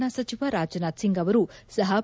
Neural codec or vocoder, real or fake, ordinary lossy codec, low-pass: none; real; none; none